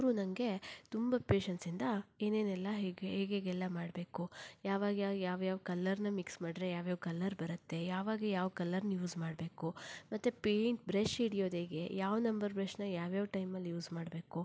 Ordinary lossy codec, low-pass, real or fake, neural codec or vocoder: none; none; real; none